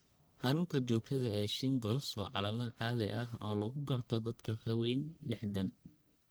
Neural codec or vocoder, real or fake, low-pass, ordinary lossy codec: codec, 44.1 kHz, 1.7 kbps, Pupu-Codec; fake; none; none